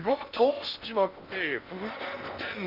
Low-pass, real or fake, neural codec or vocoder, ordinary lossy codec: 5.4 kHz; fake; codec, 16 kHz in and 24 kHz out, 0.6 kbps, FocalCodec, streaming, 2048 codes; none